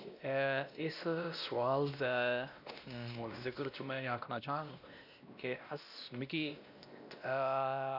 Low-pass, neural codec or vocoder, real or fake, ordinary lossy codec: 5.4 kHz; codec, 16 kHz, 1 kbps, X-Codec, WavLM features, trained on Multilingual LibriSpeech; fake; none